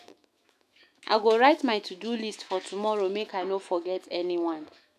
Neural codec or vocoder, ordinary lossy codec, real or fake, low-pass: autoencoder, 48 kHz, 128 numbers a frame, DAC-VAE, trained on Japanese speech; none; fake; 14.4 kHz